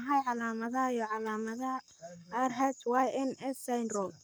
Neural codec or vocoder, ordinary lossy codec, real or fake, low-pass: codec, 44.1 kHz, 7.8 kbps, Pupu-Codec; none; fake; none